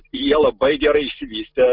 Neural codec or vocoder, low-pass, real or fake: none; 5.4 kHz; real